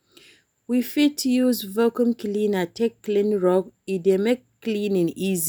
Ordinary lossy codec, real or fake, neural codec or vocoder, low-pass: none; real; none; none